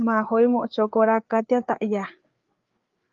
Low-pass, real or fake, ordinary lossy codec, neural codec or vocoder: 7.2 kHz; fake; Opus, 32 kbps; codec, 16 kHz, 4 kbps, FreqCodec, larger model